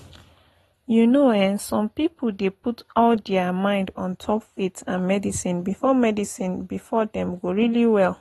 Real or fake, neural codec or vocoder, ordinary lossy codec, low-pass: real; none; AAC, 32 kbps; 19.8 kHz